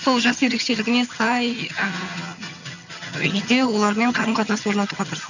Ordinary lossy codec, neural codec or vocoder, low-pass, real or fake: none; vocoder, 22.05 kHz, 80 mel bands, HiFi-GAN; 7.2 kHz; fake